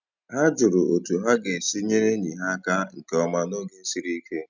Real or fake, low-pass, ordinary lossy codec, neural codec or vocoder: real; 7.2 kHz; none; none